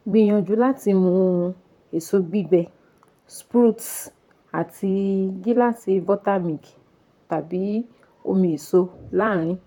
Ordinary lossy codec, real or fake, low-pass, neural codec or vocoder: none; fake; 19.8 kHz; vocoder, 44.1 kHz, 128 mel bands, Pupu-Vocoder